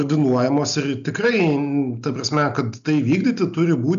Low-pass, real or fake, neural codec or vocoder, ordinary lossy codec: 7.2 kHz; real; none; AAC, 96 kbps